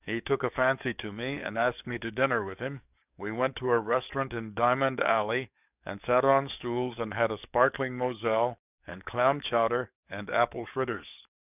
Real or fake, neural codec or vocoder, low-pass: fake; codec, 44.1 kHz, 7.8 kbps, DAC; 3.6 kHz